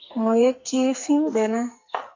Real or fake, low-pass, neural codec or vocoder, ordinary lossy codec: fake; 7.2 kHz; codec, 44.1 kHz, 2.6 kbps, SNAC; MP3, 48 kbps